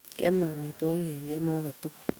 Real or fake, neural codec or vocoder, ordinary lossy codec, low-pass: fake; codec, 44.1 kHz, 2.6 kbps, DAC; none; none